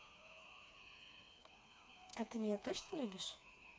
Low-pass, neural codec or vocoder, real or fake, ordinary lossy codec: none; codec, 16 kHz, 4 kbps, FreqCodec, smaller model; fake; none